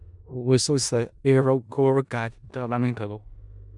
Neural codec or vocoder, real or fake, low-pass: codec, 16 kHz in and 24 kHz out, 0.4 kbps, LongCat-Audio-Codec, four codebook decoder; fake; 10.8 kHz